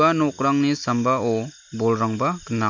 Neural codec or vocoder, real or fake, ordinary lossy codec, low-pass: none; real; MP3, 48 kbps; 7.2 kHz